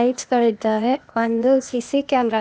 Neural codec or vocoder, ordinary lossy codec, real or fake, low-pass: codec, 16 kHz, 0.8 kbps, ZipCodec; none; fake; none